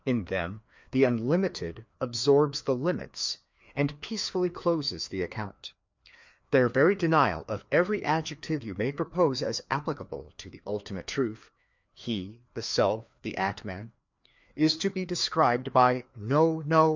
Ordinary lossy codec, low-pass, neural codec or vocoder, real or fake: MP3, 64 kbps; 7.2 kHz; codec, 16 kHz, 2 kbps, FreqCodec, larger model; fake